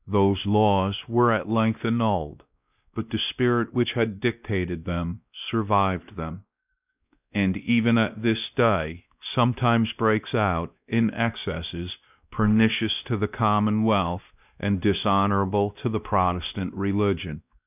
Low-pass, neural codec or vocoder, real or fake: 3.6 kHz; codec, 16 kHz, 1 kbps, X-Codec, WavLM features, trained on Multilingual LibriSpeech; fake